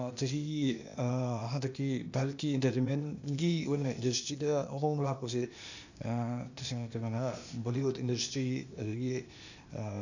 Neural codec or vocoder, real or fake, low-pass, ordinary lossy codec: codec, 16 kHz, 0.8 kbps, ZipCodec; fake; 7.2 kHz; none